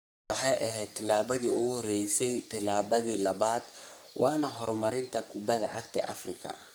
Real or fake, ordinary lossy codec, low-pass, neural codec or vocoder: fake; none; none; codec, 44.1 kHz, 3.4 kbps, Pupu-Codec